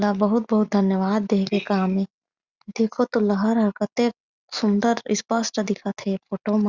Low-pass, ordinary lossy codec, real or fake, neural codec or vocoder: 7.2 kHz; Opus, 64 kbps; real; none